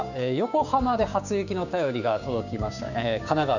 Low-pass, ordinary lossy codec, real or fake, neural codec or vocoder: 7.2 kHz; none; fake; codec, 16 kHz, 6 kbps, DAC